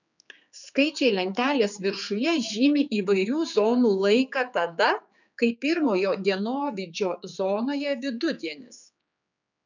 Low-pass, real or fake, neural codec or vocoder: 7.2 kHz; fake; codec, 16 kHz, 4 kbps, X-Codec, HuBERT features, trained on general audio